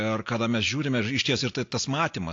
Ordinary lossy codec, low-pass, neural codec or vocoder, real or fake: AAC, 48 kbps; 7.2 kHz; none; real